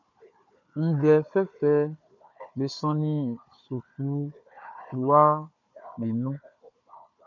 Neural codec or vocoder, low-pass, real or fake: codec, 16 kHz, 4 kbps, FunCodec, trained on Chinese and English, 50 frames a second; 7.2 kHz; fake